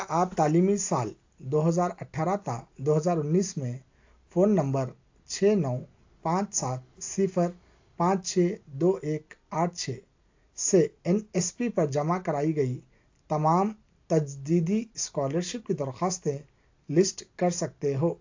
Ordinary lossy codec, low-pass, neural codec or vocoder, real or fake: AAC, 48 kbps; 7.2 kHz; none; real